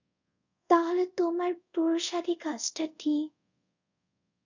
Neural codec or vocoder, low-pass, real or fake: codec, 24 kHz, 0.5 kbps, DualCodec; 7.2 kHz; fake